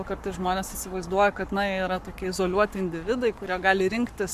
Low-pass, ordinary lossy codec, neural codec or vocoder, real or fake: 14.4 kHz; MP3, 96 kbps; codec, 44.1 kHz, 7.8 kbps, Pupu-Codec; fake